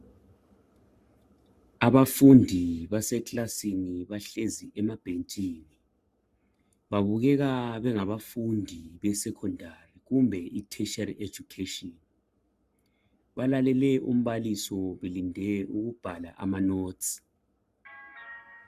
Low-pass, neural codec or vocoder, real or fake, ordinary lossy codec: 14.4 kHz; codec, 44.1 kHz, 7.8 kbps, Pupu-Codec; fake; Opus, 64 kbps